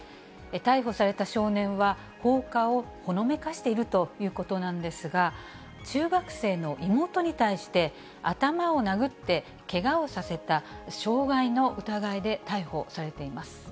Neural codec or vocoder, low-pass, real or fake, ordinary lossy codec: none; none; real; none